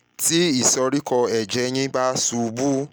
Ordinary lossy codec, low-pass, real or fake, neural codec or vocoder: none; none; real; none